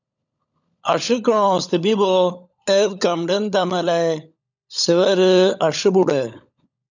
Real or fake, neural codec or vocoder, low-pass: fake; codec, 16 kHz, 16 kbps, FunCodec, trained on LibriTTS, 50 frames a second; 7.2 kHz